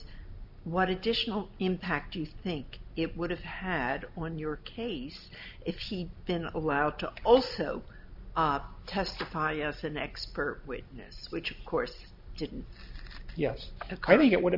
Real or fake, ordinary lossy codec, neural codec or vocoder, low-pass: real; MP3, 48 kbps; none; 5.4 kHz